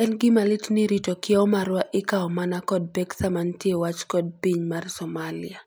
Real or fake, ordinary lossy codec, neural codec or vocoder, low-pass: real; none; none; none